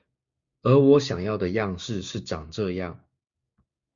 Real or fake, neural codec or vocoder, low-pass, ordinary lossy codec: fake; codec, 16 kHz, 6 kbps, DAC; 7.2 kHz; Opus, 64 kbps